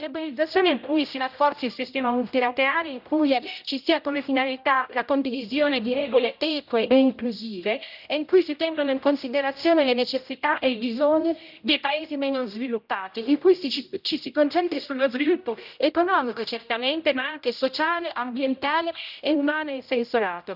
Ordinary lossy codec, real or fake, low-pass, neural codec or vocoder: none; fake; 5.4 kHz; codec, 16 kHz, 0.5 kbps, X-Codec, HuBERT features, trained on general audio